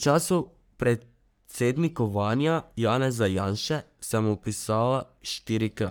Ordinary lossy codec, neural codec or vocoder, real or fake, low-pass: none; codec, 44.1 kHz, 3.4 kbps, Pupu-Codec; fake; none